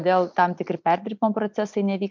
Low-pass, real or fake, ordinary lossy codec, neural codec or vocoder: 7.2 kHz; real; MP3, 64 kbps; none